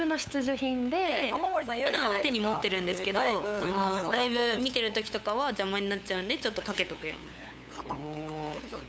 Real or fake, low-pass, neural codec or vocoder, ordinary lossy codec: fake; none; codec, 16 kHz, 8 kbps, FunCodec, trained on LibriTTS, 25 frames a second; none